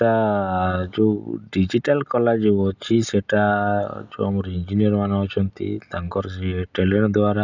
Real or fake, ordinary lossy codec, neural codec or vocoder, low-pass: real; none; none; 7.2 kHz